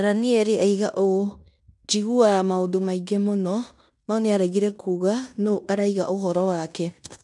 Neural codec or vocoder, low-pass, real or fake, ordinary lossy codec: codec, 16 kHz in and 24 kHz out, 0.9 kbps, LongCat-Audio-Codec, fine tuned four codebook decoder; 10.8 kHz; fake; none